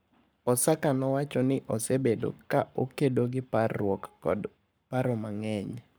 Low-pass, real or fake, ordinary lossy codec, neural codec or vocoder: none; fake; none; codec, 44.1 kHz, 7.8 kbps, Pupu-Codec